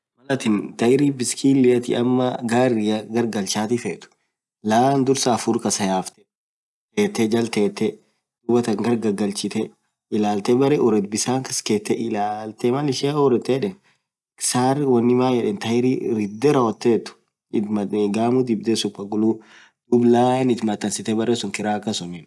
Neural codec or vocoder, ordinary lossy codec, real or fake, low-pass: none; none; real; none